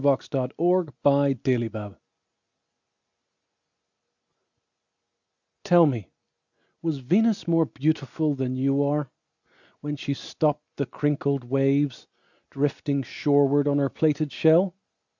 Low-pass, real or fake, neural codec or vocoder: 7.2 kHz; real; none